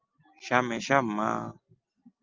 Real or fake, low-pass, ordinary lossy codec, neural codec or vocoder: real; 7.2 kHz; Opus, 32 kbps; none